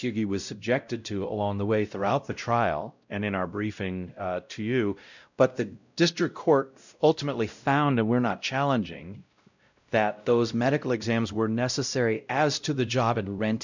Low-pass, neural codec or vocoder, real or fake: 7.2 kHz; codec, 16 kHz, 0.5 kbps, X-Codec, WavLM features, trained on Multilingual LibriSpeech; fake